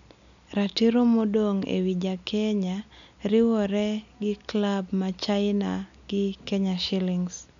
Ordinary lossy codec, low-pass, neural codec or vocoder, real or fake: none; 7.2 kHz; none; real